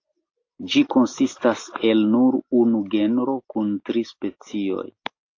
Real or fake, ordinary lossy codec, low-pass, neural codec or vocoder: real; AAC, 48 kbps; 7.2 kHz; none